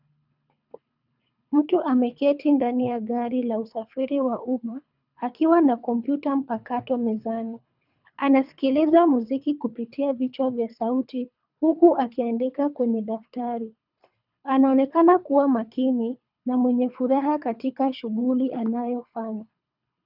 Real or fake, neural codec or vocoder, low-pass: fake; codec, 24 kHz, 3 kbps, HILCodec; 5.4 kHz